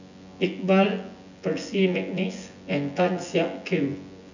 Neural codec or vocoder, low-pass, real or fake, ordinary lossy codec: vocoder, 24 kHz, 100 mel bands, Vocos; 7.2 kHz; fake; none